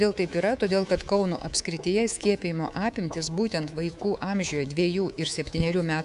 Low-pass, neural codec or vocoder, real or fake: 10.8 kHz; codec, 24 kHz, 3.1 kbps, DualCodec; fake